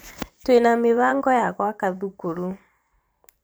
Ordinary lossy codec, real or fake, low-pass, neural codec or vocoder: none; fake; none; vocoder, 44.1 kHz, 128 mel bands every 512 samples, BigVGAN v2